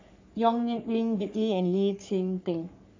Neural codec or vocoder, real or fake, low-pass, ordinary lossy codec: codec, 44.1 kHz, 3.4 kbps, Pupu-Codec; fake; 7.2 kHz; AAC, 48 kbps